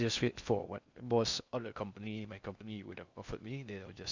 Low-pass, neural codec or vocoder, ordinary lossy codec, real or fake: 7.2 kHz; codec, 16 kHz in and 24 kHz out, 0.6 kbps, FocalCodec, streaming, 2048 codes; Opus, 64 kbps; fake